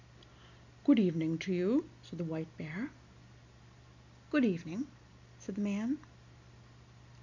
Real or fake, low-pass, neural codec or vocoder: real; 7.2 kHz; none